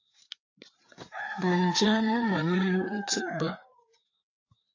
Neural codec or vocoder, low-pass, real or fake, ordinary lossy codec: codec, 16 kHz, 4 kbps, FreqCodec, larger model; 7.2 kHz; fake; AAC, 48 kbps